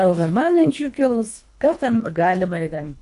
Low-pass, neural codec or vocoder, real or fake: 10.8 kHz; codec, 24 kHz, 1.5 kbps, HILCodec; fake